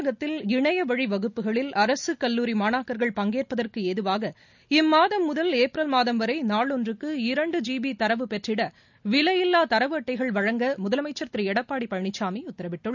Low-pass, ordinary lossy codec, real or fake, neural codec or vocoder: 7.2 kHz; none; real; none